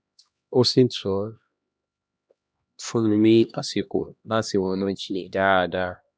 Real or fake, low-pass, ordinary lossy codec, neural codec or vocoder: fake; none; none; codec, 16 kHz, 1 kbps, X-Codec, HuBERT features, trained on LibriSpeech